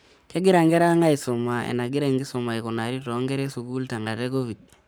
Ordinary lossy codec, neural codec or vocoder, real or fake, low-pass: none; codec, 44.1 kHz, 7.8 kbps, Pupu-Codec; fake; none